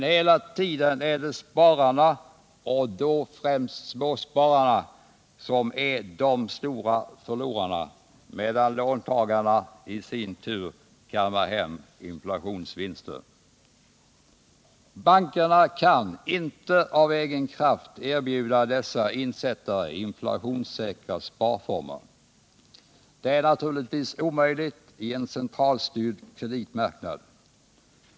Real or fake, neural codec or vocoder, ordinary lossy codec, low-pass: real; none; none; none